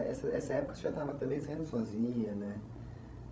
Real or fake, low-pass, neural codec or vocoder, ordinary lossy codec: fake; none; codec, 16 kHz, 16 kbps, FreqCodec, larger model; none